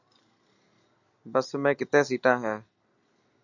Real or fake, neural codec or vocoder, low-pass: real; none; 7.2 kHz